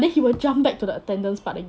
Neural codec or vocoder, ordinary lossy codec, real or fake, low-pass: none; none; real; none